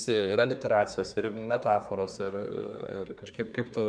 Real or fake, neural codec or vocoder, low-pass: fake; codec, 24 kHz, 1 kbps, SNAC; 9.9 kHz